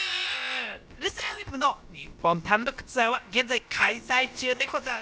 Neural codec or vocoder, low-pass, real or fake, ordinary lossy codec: codec, 16 kHz, about 1 kbps, DyCAST, with the encoder's durations; none; fake; none